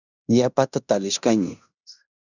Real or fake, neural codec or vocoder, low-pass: fake; codec, 16 kHz in and 24 kHz out, 0.9 kbps, LongCat-Audio-Codec, fine tuned four codebook decoder; 7.2 kHz